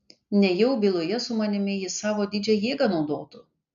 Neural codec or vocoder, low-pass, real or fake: none; 7.2 kHz; real